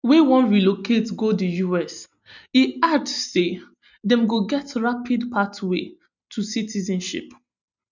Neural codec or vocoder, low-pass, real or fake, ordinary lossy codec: none; 7.2 kHz; real; none